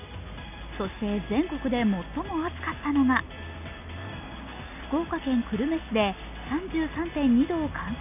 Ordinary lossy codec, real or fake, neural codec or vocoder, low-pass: none; real; none; 3.6 kHz